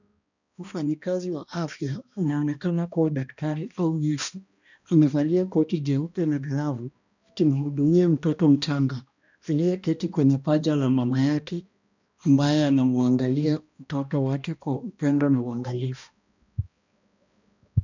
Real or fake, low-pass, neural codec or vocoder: fake; 7.2 kHz; codec, 16 kHz, 1 kbps, X-Codec, HuBERT features, trained on balanced general audio